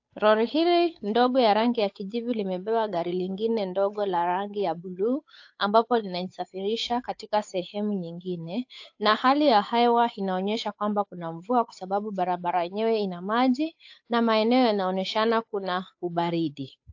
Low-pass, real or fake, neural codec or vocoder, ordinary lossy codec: 7.2 kHz; fake; codec, 16 kHz, 8 kbps, FunCodec, trained on Chinese and English, 25 frames a second; AAC, 48 kbps